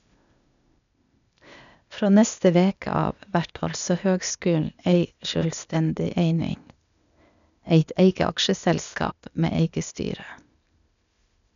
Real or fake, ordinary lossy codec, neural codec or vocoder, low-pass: fake; none; codec, 16 kHz, 0.8 kbps, ZipCodec; 7.2 kHz